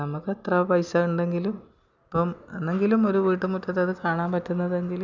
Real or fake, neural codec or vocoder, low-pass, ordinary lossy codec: real; none; 7.2 kHz; none